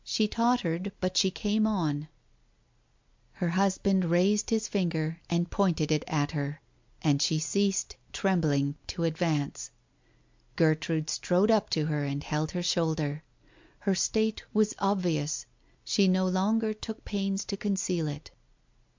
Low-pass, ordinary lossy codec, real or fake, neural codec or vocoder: 7.2 kHz; MP3, 64 kbps; real; none